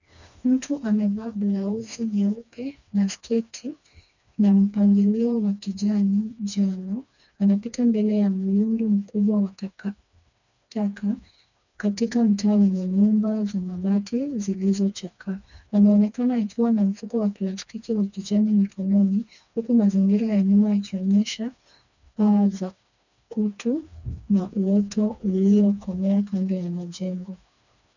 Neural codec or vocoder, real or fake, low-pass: codec, 16 kHz, 2 kbps, FreqCodec, smaller model; fake; 7.2 kHz